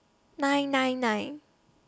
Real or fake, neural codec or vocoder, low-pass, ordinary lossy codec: real; none; none; none